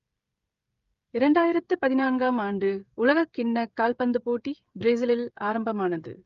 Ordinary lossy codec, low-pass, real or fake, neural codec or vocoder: Opus, 32 kbps; 7.2 kHz; fake; codec, 16 kHz, 16 kbps, FreqCodec, smaller model